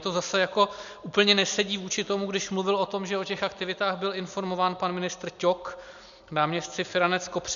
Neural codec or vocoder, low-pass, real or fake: none; 7.2 kHz; real